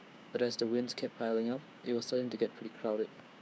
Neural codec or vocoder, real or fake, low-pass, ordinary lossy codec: codec, 16 kHz, 6 kbps, DAC; fake; none; none